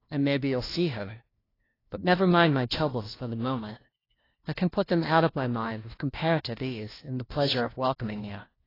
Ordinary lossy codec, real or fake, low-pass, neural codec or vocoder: AAC, 24 kbps; fake; 5.4 kHz; codec, 16 kHz, 1 kbps, FunCodec, trained on LibriTTS, 50 frames a second